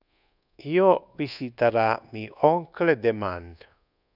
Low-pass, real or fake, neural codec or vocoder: 5.4 kHz; fake; codec, 24 kHz, 1.2 kbps, DualCodec